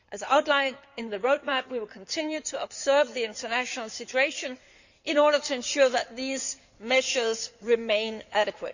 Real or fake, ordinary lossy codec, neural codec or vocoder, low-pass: fake; none; codec, 16 kHz in and 24 kHz out, 2.2 kbps, FireRedTTS-2 codec; 7.2 kHz